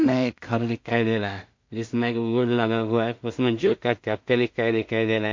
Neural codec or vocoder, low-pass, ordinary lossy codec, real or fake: codec, 16 kHz in and 24 kHz out, 0.4 kbps, LongCat-Audio-Codec, two codebook decoder; 7.2 kHz; MP3, 48 kbps; fake